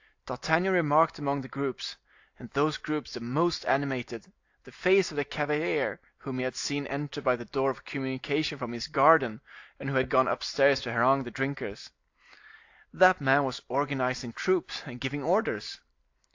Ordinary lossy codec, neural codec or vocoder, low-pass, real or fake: AAC, 48 kbps; none; 7.2 kHz; real